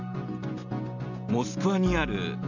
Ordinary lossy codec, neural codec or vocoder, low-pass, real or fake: none; none; 7.2 kHz; real